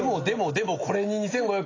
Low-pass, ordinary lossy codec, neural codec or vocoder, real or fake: 7.2 kHz; AAC, 32 kbps; none; real